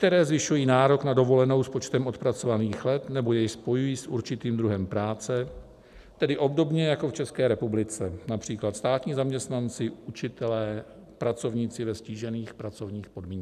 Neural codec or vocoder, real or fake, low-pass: none; real; 14.4 kHz